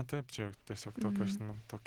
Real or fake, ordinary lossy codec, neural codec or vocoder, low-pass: real; Opus, 16 kbps; none; 19.8 kHz